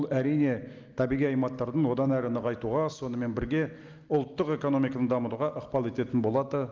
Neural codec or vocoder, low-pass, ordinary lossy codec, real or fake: none; 7.2 kHz; Opus, 32 kbps; real